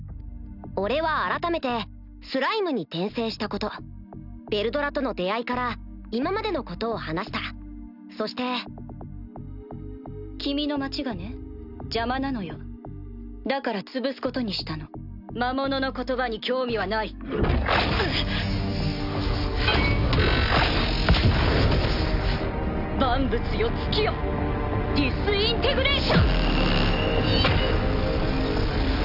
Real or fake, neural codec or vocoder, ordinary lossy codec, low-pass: real; none; none; 5.4 kHz